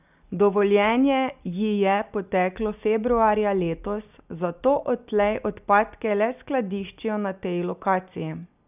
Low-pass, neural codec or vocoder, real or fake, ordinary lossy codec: 3.6 kHz; none; real; none